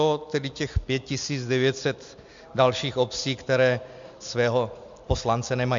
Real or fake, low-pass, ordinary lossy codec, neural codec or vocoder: real; 7.2 kHz; MP3, 64 kbps; none